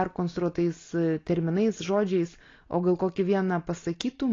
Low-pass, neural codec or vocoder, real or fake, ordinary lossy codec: 7.2 kHz; none; real; AAC, 32 kbps